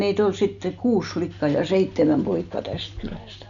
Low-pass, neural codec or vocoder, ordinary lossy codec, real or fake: 7.2 kHz; none; none; real